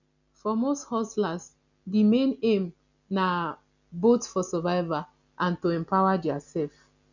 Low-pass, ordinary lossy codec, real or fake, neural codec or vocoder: 7.2 kHz; none; real; none